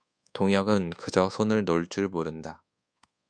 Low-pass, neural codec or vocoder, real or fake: 9.9 kHz; codec, 24 kHz, 1.2 kbps, DualCodec; fake